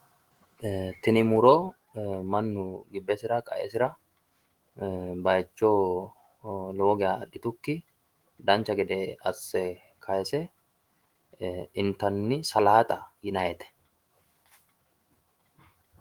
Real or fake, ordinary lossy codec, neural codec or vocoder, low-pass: fake; Opus, 24 kbps; autoencoder, 48 kHz, 128 numbers a frame, DAC-VAE, trained on Japanese speech; 19.8 kHz